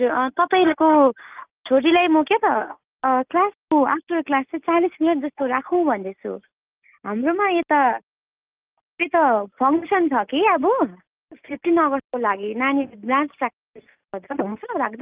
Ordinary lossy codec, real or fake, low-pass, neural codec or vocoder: Opus, 32 kbps; real; 3.6 kHz; none